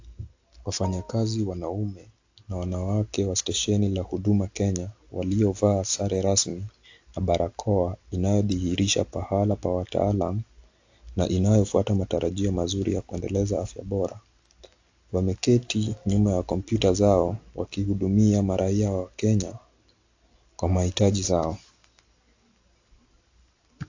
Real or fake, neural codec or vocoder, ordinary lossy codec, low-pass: real; none; AAC, 48 kbps; 7.2 kHz